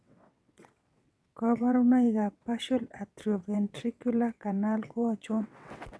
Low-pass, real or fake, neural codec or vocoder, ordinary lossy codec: none; fake; vocoder, 22.05 kHz, 80 mel bands, WaveNeXt; none